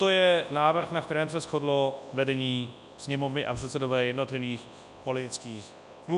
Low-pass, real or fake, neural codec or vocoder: 10.8 kHz; fake; codec, 24 kHz, 0.9 kbps, WavTokenizer, large speech release